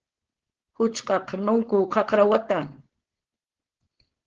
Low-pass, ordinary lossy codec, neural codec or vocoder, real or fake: 7.2 kHz; Opus, 16 kbps; codec, 16 kHz, 4.8 kbps, FACodec; fake